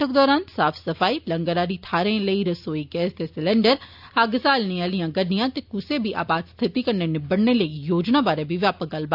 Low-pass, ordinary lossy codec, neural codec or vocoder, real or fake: 5.4 kHz; AAC, 48 kbps; none; real